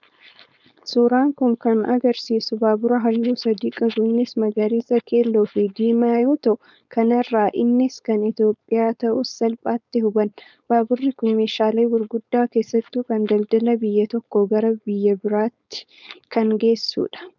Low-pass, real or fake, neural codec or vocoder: 7.2 kHz; fake; codec, 16 kHz, 4.8 kbps, FACodec